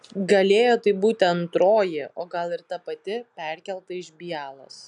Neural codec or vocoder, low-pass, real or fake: none; 10.8 kHz; real